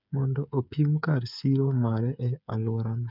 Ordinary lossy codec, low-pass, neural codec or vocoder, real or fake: none; 5.4 kHz; codec, 16 kHz, 8 kbps, FreqCodec, smaller model; fake